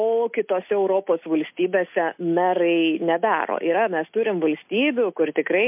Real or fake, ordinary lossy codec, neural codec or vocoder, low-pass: real; MP3, 32 kbps; none; 3.6 kHz